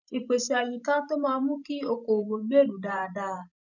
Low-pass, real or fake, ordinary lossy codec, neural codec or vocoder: 7.2 kHz; fake; none; vocoder, 44.1 kHz, 128 mel bands every 512 samples, BigVGAN v2